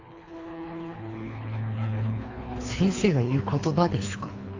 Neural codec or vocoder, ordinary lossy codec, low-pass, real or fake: codec, 24 kHz, 3 kbps, HILCodec; AAC, 48 kbps; 7.2 kHz; fake